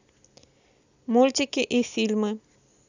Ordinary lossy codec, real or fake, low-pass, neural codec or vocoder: none; real; 7.2 kHz; none